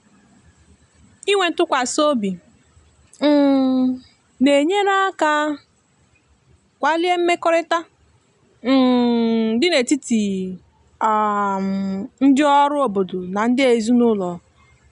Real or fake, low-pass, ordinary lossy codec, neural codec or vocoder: real; 10.8 kHz; none; none